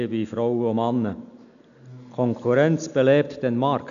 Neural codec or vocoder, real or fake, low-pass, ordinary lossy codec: none; real; 7.2 kHz; none